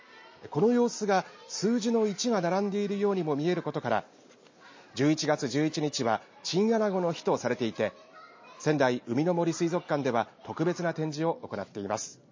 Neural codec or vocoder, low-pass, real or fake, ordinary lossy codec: none; 7.2 kHz; real; MP3, 32 kbps